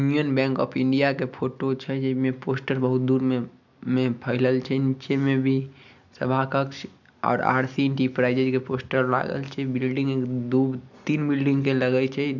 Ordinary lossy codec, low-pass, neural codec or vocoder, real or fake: none; 7.2 kHz; none; real